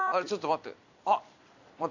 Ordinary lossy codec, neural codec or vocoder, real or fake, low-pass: none; vocoder, 44.1 kHz, 128 mel bands every 256 samples, BigVGAN v2; fake; 7.2 kHz